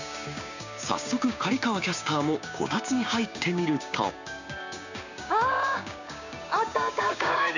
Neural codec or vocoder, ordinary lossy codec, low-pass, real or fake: codec, 16 kHz, 6 kbps, DAC; none; 7.2 kHz; fake